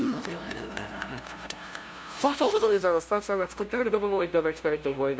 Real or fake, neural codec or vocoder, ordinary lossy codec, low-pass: fake; codec, 16 kHz, 0.5 kbps, FunCodec, trained on LibriTTS, 25 frames a second; none; none